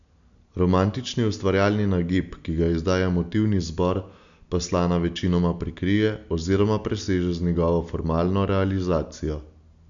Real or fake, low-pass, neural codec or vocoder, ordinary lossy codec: real; 7.2 kHz; none; none